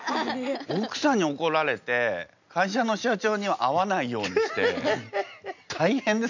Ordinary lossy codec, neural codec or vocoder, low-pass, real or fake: none; none; 7.2 kHz; real